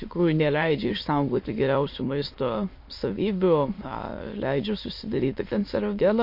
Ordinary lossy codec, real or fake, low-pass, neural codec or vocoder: MP3, 32 kbps; fake; 5.4 kHz; autoencoder, 22.05 kHz, a latent of 192 numbers a frame, VITS, trained on many speakers